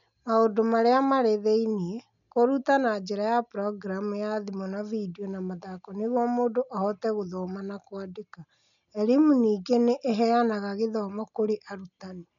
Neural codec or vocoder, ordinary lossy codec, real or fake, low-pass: none; none; real; 7.2 kHz